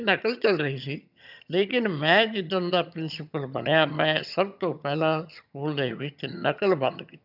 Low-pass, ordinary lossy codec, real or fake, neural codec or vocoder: 5.4 kHz; none; fake; vocoder, 22.05 kHz, 80 mel bands, HiFi-GAN